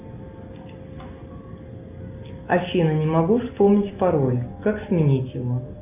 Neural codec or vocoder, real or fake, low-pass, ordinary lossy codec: none; real; 3.6 kHz; AAC, 32 kbps